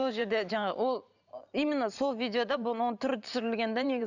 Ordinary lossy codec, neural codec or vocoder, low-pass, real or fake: none; vocoder, 44.1 kHz, 128 mel bands every 512 samples, BigVGAN v2; 7.2 kHz; fake